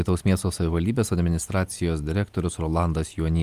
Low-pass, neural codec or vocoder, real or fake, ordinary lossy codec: 14.4 kHz; none; real; Opus, 32 kbps